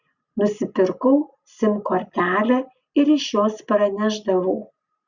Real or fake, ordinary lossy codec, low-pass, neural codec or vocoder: real; Opus, 64 kbps; 7.2 kHz; none